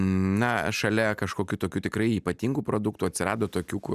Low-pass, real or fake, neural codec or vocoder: 14.4 kHz; real; none